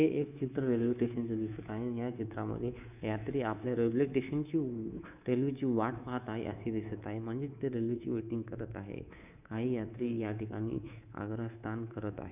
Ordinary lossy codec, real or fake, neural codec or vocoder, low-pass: none; fake; codec, 24 kHz, 3.1 kbps, DualCodec; 3.6 kHz